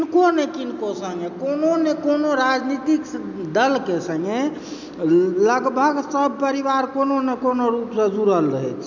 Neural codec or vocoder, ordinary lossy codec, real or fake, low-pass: none; none; real; 7.2 kHz